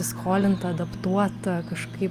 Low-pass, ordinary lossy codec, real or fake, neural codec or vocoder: 14.4 kHz; Opus, 32 kbps; real; none